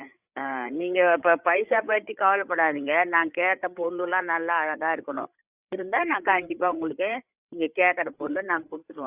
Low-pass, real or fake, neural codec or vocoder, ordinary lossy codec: 3.6 kHz; fake; codec, 16 kHz, 8 kbps, FreqCodec, larger model; Opus, 64 kbps